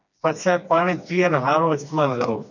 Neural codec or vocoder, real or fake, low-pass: codec, 16 kHz, 2 kbps, FreqCodec, smaller model; fake; 7.2 kHz